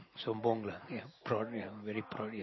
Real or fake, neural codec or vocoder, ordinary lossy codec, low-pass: real; none; MP3, 24 kbps; 7.2 kHz